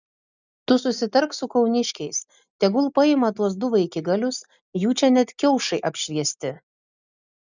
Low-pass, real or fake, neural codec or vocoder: 7.2 kHz; real; none